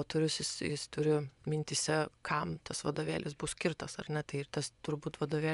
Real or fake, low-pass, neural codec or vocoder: fake; 10.8 kHz; vocoder, 24 kHz, 100 mel bands, Vocos